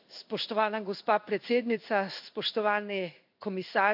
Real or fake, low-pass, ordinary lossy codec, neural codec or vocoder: fake; 5.4 kHz; AAC, 48 kbps; codec, 16 kHz in and 24 kHz out, 1 kbps, XY-Tokenizer